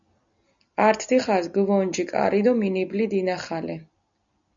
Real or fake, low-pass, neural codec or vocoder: real; 7.2 kHz; none